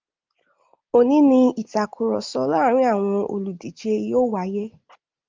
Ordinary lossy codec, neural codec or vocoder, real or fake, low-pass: Opus, 24 kbps; none; real; 7.2 kHz